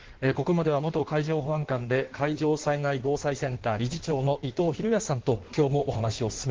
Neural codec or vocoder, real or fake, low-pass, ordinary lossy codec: codec, 16 kHz in and 24 kHz out, 1.1 kbps, FireRedTTS-2 codec; fake; 7.2 kHz; Opus, 16 kbps